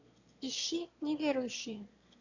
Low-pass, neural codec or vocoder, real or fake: 7.2 kHz; autoencoder, 22.05 kHz, a latent of 192 numbers a frame, VITS, trained on one speaker; fake